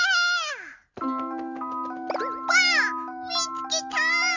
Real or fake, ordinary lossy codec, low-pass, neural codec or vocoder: real; Opus, 64 kbps; 7.2 kHz; none